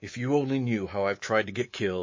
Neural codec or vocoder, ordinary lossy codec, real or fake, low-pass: codec, 24 kHz, 3.1 kbps, DualCodec; MP3, 32 kbps; fake; 7.2 kHz